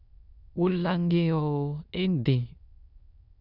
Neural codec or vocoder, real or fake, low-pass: autoencoder, 22.05 kHz, a latent of 192 numbers a frame, VITS, trained on many speakers; fake; 5.4 kHz